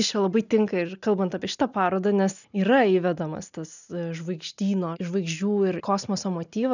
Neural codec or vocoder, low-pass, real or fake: none; 7.2 kHz; real